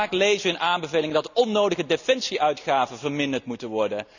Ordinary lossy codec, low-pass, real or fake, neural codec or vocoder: none; 7.2 kHz; real; none